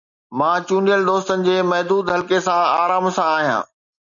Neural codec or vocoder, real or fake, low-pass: none; real; 7.2 kHz